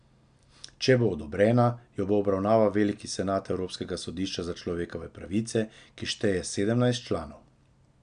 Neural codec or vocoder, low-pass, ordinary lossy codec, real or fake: none; 9.9 kHz; none; real